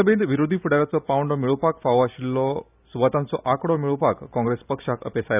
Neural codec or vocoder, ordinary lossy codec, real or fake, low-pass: none; none; real; 3.6 kHz